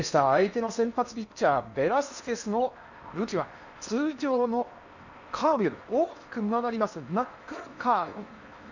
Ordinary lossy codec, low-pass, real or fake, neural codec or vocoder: none; 7.2 kHz; fake; codec, 16 kHz in and 24 kHz out, 0.8 kbps, FocalCodec, streaming, 65536 codes